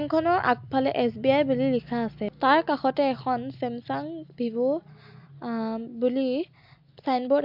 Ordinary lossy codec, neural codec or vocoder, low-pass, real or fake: MP3, 48 kbps; none; 5.4 kHz; real